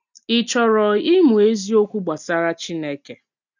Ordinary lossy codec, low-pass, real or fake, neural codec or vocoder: none; 7.2 kHz; real; none